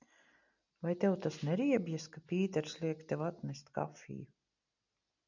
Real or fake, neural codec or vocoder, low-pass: real; none; 7.2 kHz